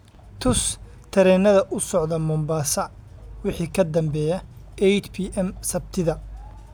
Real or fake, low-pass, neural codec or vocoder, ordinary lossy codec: real; none; none; none